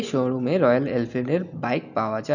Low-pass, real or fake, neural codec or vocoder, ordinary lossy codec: 7.2 kHz; real; none; none